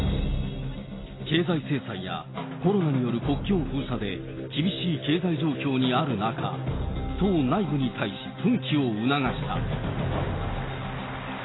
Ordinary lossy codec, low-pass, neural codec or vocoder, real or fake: AAC, 16 kbps; 7.2 kHz; none; real